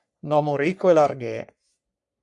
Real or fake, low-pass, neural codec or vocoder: fake; 10.8 kHz; codec, 44.1 kHz, 3.4 kbps, Pupu-Codec